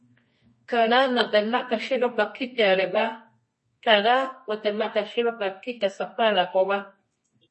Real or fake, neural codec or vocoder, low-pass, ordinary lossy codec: fake; codec, 24 kHz, 0.9 kbps, WavTokenizer, medium music audio release; 10.8 kHz; MP3, 32 kbps